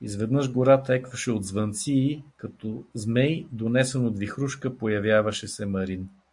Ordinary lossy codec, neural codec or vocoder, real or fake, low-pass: AAC, 64 kbps; none; real; 10.8 kHz